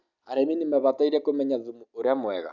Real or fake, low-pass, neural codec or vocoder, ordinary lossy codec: real; 7.2 kHz; none; none